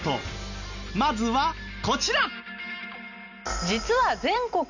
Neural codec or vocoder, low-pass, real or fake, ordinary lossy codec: none; 7.2 kHz; real; none